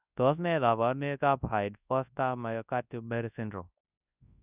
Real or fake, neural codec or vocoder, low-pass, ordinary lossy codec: fake; codec, 24 kHz, 0.9 kbps, WavTokenizer, large speech release; 3.6 kHz; none